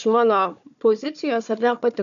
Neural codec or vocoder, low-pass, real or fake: codec, 16 kHz, 4 kbps, FunCodec, trained on Chinese and English, 50 frames a second; 7.2 kHz; fake